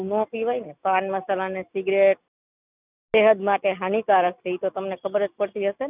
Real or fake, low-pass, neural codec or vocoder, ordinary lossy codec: real; 3.6 kHz; none; none